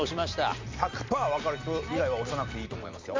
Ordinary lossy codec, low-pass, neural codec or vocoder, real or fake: MP3, 64 kbps; 7.2 kHz; none; real